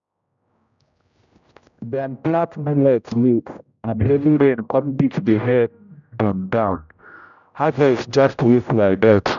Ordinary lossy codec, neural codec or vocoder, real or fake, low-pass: none; codec, 16 kHz, 0.5 kbps, X-Codec, HuBERT features, trained on general audio; fake; 7.2 kHz